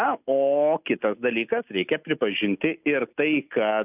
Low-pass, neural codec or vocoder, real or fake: 3.6 kHz; none; real